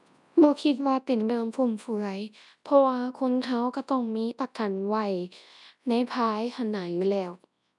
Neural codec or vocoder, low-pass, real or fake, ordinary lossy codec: codec, 24 kHz, 0.9 kbps, WavTokenizer, large speech release; 10.8 kHz; fake; none